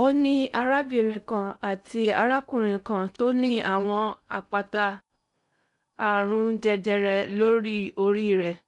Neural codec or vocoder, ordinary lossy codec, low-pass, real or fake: codec, 16 kHz in and 24 kHz out, 0.6 kbps, FocalCodec, streaming, 2048 codes; none; 10.8 kHz; fake